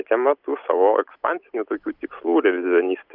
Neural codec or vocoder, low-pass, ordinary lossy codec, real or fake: none; 5.4 kHz; Opus, 24 kbps; real